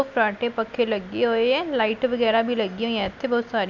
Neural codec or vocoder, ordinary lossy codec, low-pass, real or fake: none; none; 7.2 kHz; real